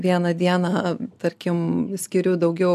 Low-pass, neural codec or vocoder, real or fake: 14.4 kHz; none; real